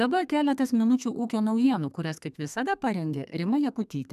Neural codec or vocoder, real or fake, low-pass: codec, 32 kHz, 1.9 kbps, SNAC; fake; 14.4 kHz